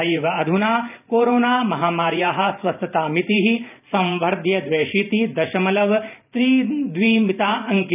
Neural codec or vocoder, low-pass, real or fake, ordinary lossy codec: vocoder, 44.1 kHz, 128 mel bands every 512 samples, BigVGAN v2; 3.6 kHz; fake; none